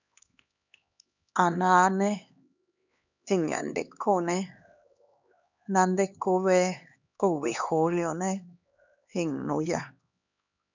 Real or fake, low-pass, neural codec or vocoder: fake; 7.2 kHz; codec, 16 kHz, 2 kbps, X-Codec, HuBERT features, trained on LibriSpeech